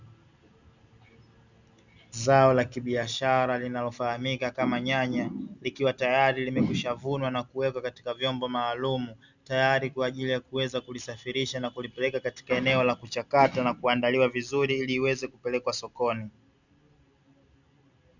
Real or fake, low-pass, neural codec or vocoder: real; 7.2 kHz; none